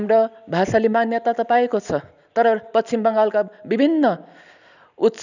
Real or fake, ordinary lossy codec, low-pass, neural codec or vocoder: real; none; 7.2 kHz; none